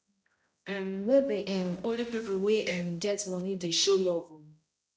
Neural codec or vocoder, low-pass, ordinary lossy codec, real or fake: codec, 16 kHz, 0.5 kbps, X-Codec, HuBERT features, trained on balanced general audio; none; none; fake